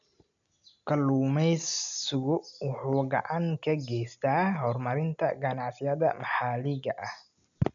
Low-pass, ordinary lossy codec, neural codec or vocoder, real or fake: 7.2 kHz; none; none; real